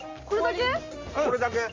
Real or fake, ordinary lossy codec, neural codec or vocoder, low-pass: real; Opus, 32 kbps; none; 7.2 kHz